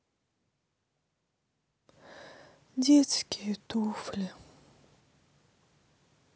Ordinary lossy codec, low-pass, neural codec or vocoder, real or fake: none; none; none; real